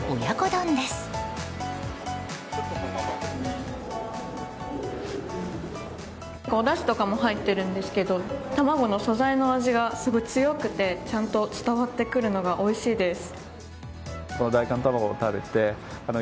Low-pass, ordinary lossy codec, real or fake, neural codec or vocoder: none; none; real; none